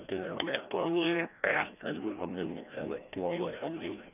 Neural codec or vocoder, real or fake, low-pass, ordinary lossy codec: codec, 16 kHz, 1 kbps, FreqCodec, larger model; fake; 3.6 kHz; none